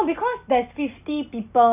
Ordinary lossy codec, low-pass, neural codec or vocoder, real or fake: none; 3.6 kHz; none; real